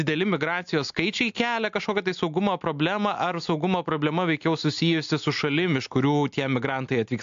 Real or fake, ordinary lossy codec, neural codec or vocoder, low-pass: real; AAC, 64 kbps; none; 7.2 kHz